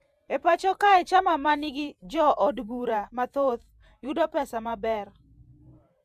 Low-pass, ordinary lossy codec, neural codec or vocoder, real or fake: 14.4 kHz; none; vocoder, 48 kHz, 128 mel bands, Vocos; fake